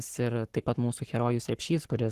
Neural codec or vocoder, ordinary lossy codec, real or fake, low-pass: codec, 44.1 kHz, 7.8 kbps, Pupu-Codec; Opus, 16 kbps; fake; 14.4 kHz